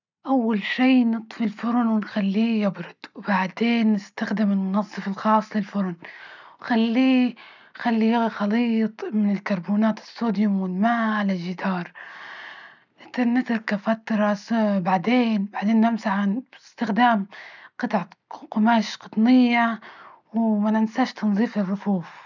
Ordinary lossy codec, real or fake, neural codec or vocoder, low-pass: none; real; none; 7.2 kHz